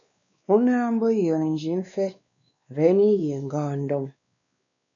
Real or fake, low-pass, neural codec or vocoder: fake; 7.2 kHz; codec, 16 kHz, 2 kbps, X-Codec, WavLM features, trained on Multilingual LibriSpeech